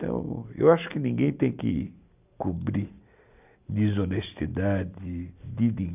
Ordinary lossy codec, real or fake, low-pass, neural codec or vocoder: none; real; 3.6 kHz; none